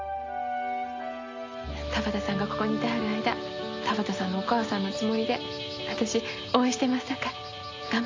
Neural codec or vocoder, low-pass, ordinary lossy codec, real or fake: none; 7.2 kHz; AAC, 32 kbps; real